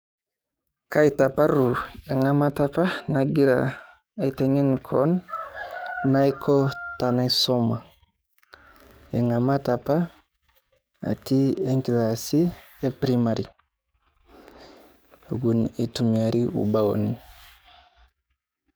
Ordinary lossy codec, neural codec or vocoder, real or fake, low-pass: none; codec, 44.1 kHz, 7.8 kbps, DAC; fake; none